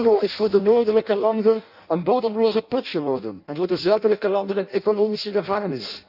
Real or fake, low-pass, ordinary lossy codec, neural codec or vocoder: fake; 5.4 kHz; none; codec, 16 kHz in and 24 kHz out, 0.6 kbps, FireRedTTS-2 codec